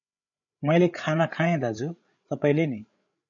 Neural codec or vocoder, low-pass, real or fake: codec, 16 kHz, 8 kbps, FreqCodec, larger model; 7.2 kHz; fake